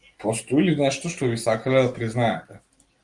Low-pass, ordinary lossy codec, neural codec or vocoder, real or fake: 10.8 kHz; Opus, 24 kbps; none; real